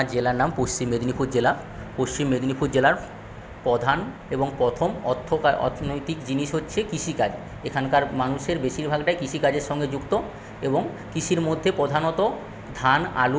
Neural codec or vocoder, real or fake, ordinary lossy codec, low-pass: none; real; none; none